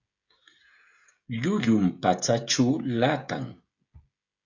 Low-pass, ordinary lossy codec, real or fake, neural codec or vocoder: 7.2 kHz; Opus, 64 kbps; fake; codec, 16 kHz, 16 kbps, FreqCodec, smaller model